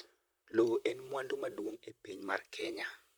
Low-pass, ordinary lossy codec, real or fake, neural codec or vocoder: none; none; fake; vocoder, 44.1 kHz, 128 mel bands, Pupu-Vocoder